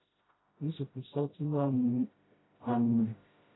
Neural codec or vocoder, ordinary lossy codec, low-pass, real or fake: codec, 16 kHz, 0.5 kbps, FreqCodec, smaller model; AAC, 16 kbps; 7.2 kHz; fake